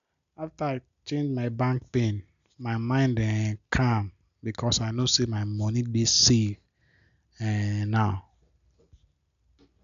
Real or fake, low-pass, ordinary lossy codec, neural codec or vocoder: real; 7.2 kHz; none; none